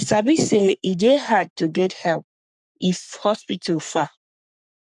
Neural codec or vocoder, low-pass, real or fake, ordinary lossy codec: codec, 44.1 kHz, 3.4 kbps, Pupu-Codec; 10.8 kHz; fake; none